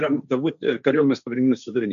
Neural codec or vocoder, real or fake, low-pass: codec, 16 kHz, 1.1 kbps, Voila-Tokenizer; fake; 7.2 kHz